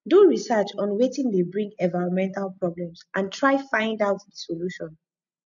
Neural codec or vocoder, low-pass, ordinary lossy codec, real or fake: none; 7.2 kHz; none; real